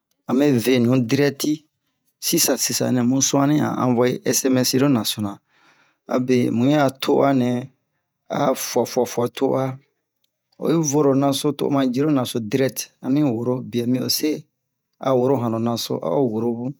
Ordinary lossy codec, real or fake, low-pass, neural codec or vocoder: none; fake; none; vocoder, 48 kHz, 128 mel bands, Vocos